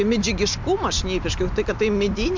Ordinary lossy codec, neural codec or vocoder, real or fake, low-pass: MP3, 64 kbps; none; real; 7.2 kHz